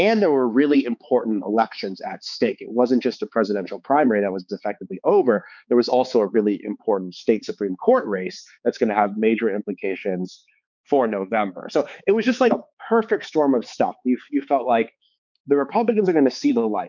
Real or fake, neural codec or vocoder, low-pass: fake; codec, 16 kHz, 4 kbps, X-Codec, HuBERT features, trained on balanced general audio; 7.2 kHz